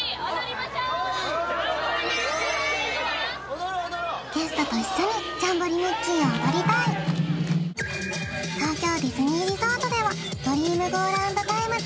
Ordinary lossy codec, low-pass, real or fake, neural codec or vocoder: none; none; real; none